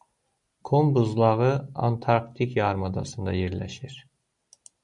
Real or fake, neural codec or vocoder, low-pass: real; none; 10.8 kHz